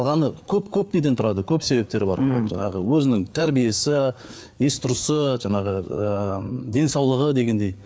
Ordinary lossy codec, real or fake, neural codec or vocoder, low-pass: none; fake; codec, 16 kHz, 4 kbps, FreqCodec, larger model; none